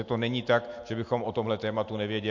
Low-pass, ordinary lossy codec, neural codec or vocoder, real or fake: 7.2 kHz; MP3, 48 kbps; none; real